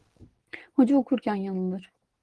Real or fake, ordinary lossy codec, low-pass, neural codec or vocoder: real; Opus, 16 kbps; 10.8 kHz; none